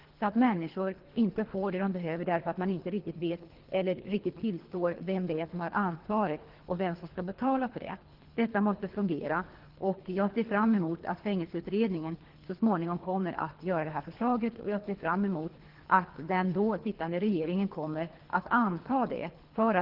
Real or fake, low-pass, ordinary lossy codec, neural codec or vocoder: fake; 5.4 kHz; Opus, 16 kbps; codec, 24 kHz, 3 kbps, HILCodec